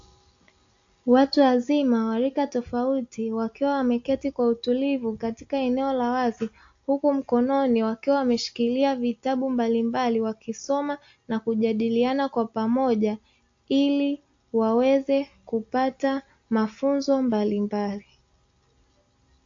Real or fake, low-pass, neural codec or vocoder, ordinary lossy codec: real; 7.2 kHz; none; AAC, 48 kbps